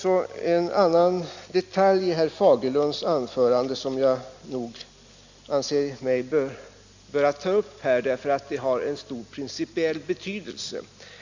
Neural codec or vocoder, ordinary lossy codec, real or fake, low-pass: none; Opus, 64 kbps; real; 7.2 kHz